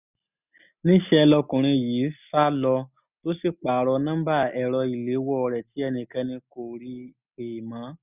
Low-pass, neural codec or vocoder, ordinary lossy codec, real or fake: 3.6 kHz; none; none; real